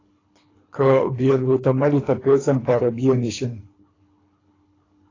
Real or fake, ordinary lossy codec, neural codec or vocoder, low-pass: fake; AAC, 32 kbps; codec, 24 kHz, 3 kbps, HILCodec; 7.2 kHz